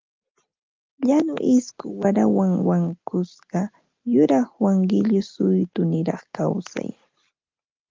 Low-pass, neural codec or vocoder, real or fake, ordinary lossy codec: 7.2 kHz; none; real; Opus, 24 kbps